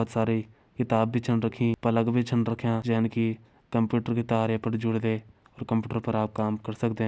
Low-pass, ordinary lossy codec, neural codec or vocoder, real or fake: none; none; none; real